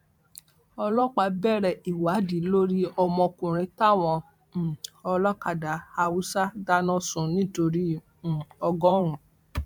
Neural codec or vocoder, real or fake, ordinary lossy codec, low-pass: vocoder, 44.1 kHz, 128 mel bands every 512 samples, BigVGAN v2; fake; MP3, 96 kbps; 19.8 kHz